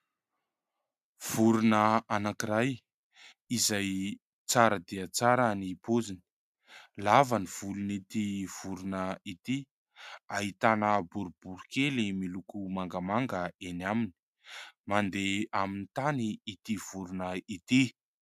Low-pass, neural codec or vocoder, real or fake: 14.4 kHz; none; real